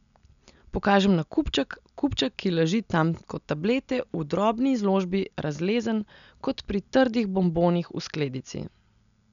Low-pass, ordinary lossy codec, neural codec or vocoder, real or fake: 7.2 kHz; none; none; real